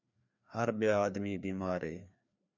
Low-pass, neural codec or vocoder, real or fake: 7.2 kHz; codec, 16 kHz, 2 kbps, FreqCodec, larger model; fake